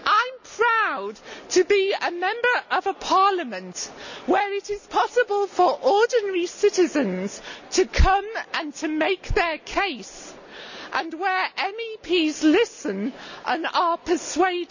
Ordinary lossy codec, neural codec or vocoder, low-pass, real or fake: none; none; 7.2 kHz; real